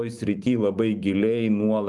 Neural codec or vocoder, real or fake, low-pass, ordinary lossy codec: vocoder, 44.1 kHz, 128 mel bands every 512 samples, BigVGAN v2; fake; 10.8 kHz; Opus, 32 kbps